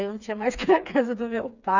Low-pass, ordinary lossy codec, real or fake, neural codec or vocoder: 7.2 kHz; none; fake; codec, 16 kHz, 4 kbps, FreqCodec, smaller model